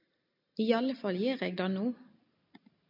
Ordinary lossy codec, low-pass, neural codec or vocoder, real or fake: MP3, 48 kbps; 5.4 kHz; none; real